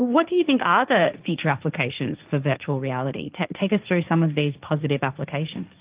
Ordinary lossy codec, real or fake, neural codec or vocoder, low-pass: Opus, 32 kbps; fake; codec, 16 kHz, 1.1 kbps, Voila-Tokenizer; 3.6 kHz